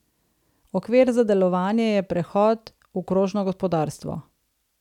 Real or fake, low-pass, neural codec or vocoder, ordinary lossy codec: real; 19.8 kHz; none; none